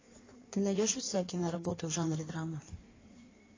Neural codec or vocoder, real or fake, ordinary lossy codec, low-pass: codec, 16 kHz in and 24 kHz out, 1.1 kbps, FireRedTTS-2 codec; fake; AAC, 32 kbps; 7.2 kHz